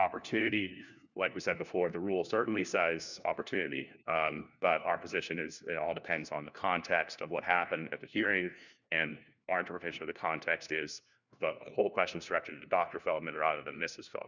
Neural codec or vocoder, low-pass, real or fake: codec, 16 kHz, 1 kbps, FunCodec, trained on LibriTTS, 50 frames a second; 7.2 kHz; fake